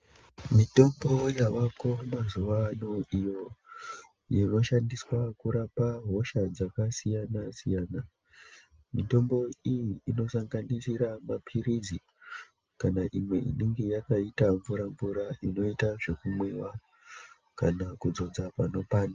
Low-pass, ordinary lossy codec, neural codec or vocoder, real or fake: 7.2 kHz; Opus, 24 kbps; none; real